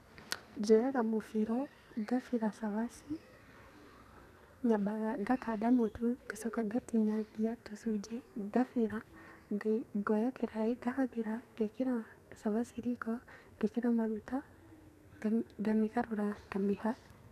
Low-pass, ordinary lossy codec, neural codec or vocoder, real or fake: 14.4 kHz; none; codec, 44.1 kHz, 2.6 kbps, SNAC; fake